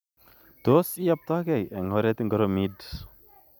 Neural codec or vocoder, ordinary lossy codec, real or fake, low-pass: none; none; real; none